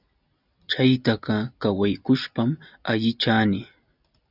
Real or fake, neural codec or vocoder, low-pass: real; none; 5.4 kHz